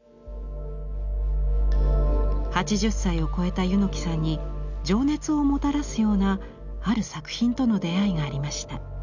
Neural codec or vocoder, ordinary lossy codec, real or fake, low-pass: none; none; real; 7.2 kHz